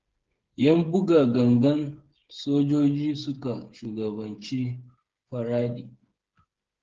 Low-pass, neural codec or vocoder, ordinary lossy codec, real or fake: 7.2 kHz; codec, 16 kHz, 8 kbps, FreqCodec, smaller model; Opus, 16 kbps; fake